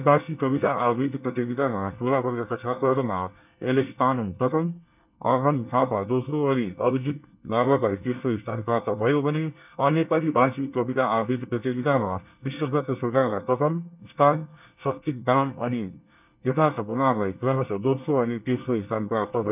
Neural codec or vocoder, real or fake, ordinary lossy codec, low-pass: codec, 24 kHz, 1 kbps, SNAC; fake; none; 3.6 kHz